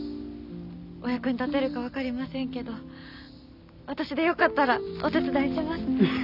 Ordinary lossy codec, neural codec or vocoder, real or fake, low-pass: none; none; real; 5.4 kHz